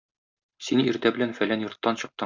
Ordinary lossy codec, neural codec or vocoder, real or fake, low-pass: MP3, 64 kbps; none; real; 7.2 kHz